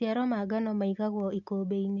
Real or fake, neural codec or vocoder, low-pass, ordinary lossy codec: real; none; 7.2 kHz; none